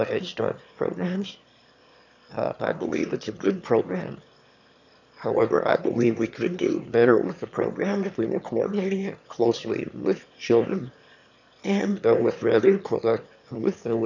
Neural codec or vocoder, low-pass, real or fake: autoencoder, 22.05 kHz, a latent of 192 numbers a frame, VITS, trained on one speaker; 7.2 kHz; fake